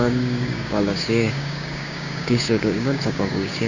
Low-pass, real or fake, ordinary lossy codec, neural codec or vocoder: 7.2 kHz; fake; none; codec, 16 kHz, 6 kbps, DAC